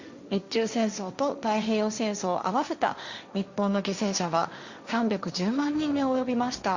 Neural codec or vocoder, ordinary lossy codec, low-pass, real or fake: codec, 16 kHz, 1.1 kbps, Voila-Tokenizer; Opus, 64 kbps; 7.2 kHz; fake